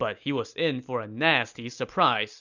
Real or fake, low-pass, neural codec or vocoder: real; 7.2 kHz; none